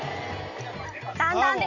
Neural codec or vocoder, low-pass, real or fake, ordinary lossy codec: none; 7.2 kHz; real; MP3, 64 kbps